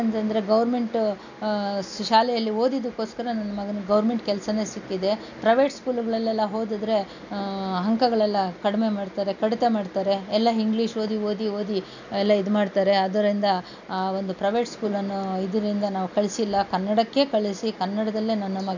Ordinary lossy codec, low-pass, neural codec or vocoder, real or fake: none; 7.2 kHz; none; real